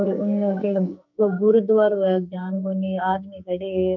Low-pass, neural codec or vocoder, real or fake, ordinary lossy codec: 7.2 kHz; codec, 44.1 kHz, 2.6 kbps, SNAC; fake; none